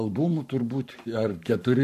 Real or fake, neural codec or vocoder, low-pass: fake; codec, 44.1 kHz, 7.8 kbps, Pupu-Codec; 14.4 kHz